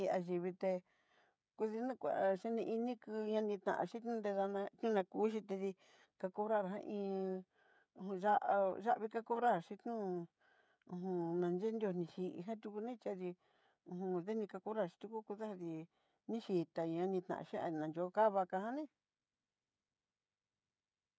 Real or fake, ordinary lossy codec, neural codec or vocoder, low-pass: fake; none; codec, 16 kHz, 16 kbps, FreqCodec, smaller model; none